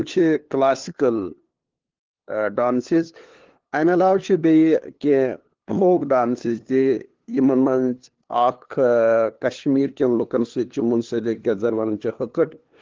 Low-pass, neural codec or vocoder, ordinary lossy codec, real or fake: 7.2 kHz; codec, 16 kHz, 2 kbps, FunCodec, trained on LibriTTS, 25 frames a second; Opus, 16 kbps; fake